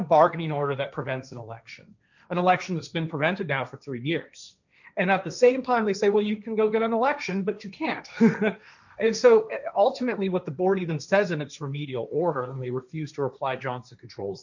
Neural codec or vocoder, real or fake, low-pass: codec, 16 kHz, 1.1 kbps, Voila-Tokenizer; fake; 7.2 kHz